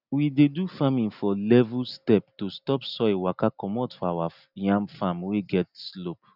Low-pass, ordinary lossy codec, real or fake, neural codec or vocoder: 5.4 kHz; none; real; none